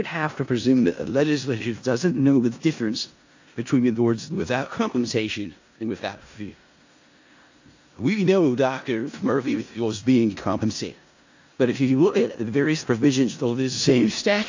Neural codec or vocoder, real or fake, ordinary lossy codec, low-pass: codec, 16 kHz in and 24 kHz out, 0.4 kbps, LongCat-Audio-Codec, four codebook decoder; fake; AAC, 48 kbps; 7.2 kHz